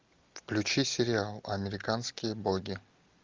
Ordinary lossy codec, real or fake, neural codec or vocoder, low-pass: Opus, 32 kbps; real; none; 7.2 kHz